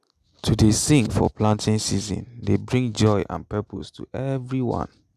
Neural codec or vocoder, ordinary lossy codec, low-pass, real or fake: none; none; 14.4 kHz; real